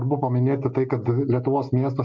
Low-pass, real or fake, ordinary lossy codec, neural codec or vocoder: 7.2 kHz; real; MP3, 64 kbps; none